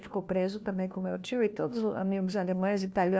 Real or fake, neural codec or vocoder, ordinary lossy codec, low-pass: fake; codec, 16 kHz, 1 kbps, FunCodec, trained on LibriTTS, 50 frames a second; none; none